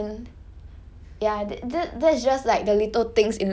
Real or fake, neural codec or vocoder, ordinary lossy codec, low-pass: real; none; none; none